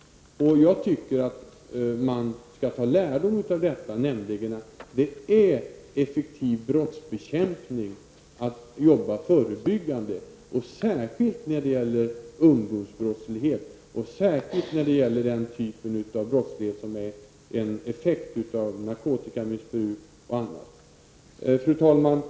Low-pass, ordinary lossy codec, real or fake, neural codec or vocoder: none; none; real; none